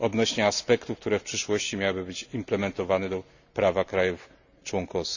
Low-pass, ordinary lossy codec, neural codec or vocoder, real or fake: 7.2 kHz; none; none; real